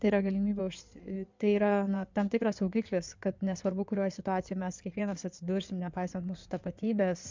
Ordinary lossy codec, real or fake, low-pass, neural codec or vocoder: MP3, 64 kbps; fake; 7.2 kHz; codec, 16 kHz in and 24 kHz out, 2.2 kbps, FireRedTTS-2 codec